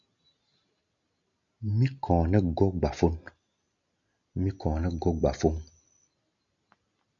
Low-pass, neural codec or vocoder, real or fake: 7.2 kHz; none; real